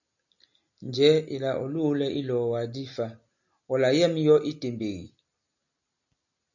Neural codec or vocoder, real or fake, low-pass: none; real; 7.2 kHz